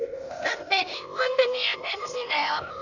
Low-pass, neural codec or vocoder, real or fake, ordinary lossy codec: 7.2 kHz; codec, 16 kHz, 0.8 kbps, ZipCodec; fake; none